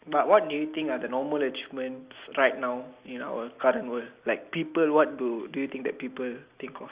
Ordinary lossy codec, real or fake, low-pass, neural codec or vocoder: Opus, 64 kbps; real; 3.6 kHz; none